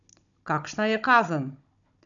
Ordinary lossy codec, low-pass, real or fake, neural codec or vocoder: none; 7.2 kHz; fake; codec, 16 kHz, 16 kbps, FunCodec, trained on Chinese and English, 50 frames a second